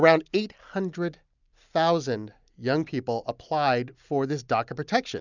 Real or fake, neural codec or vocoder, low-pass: real; none; 7.2 kHz